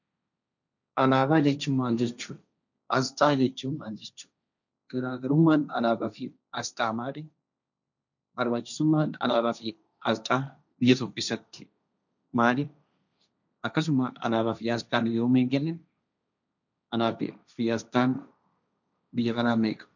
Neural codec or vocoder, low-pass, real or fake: codec, 16 kHz, 1.1 kbps, Voila-Tokenizer; 7.2 kHz; fake